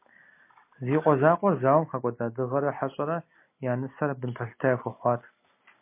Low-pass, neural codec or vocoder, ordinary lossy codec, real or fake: 3.6 kHz; none; MP3, 24 kbps; real